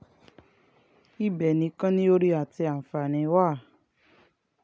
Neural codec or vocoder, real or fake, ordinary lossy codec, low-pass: none; real; none; none